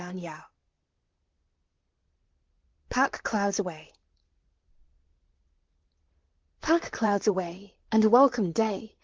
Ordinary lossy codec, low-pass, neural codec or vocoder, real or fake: Opus, 16 kbps; 7.2 kHz; vocoder, 44.1 kHz, 128 mel bands, Pupu-Vocoder; fake